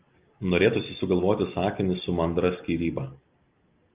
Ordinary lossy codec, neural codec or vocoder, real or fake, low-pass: Opus, 24 kbps; none; real; 3.6 kHz